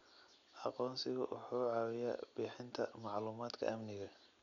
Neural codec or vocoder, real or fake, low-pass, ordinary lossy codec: none; real; 7.2 kHz; none